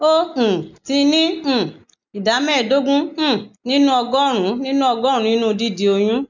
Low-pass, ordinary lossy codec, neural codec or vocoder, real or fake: 7.2 kHz; none; none; real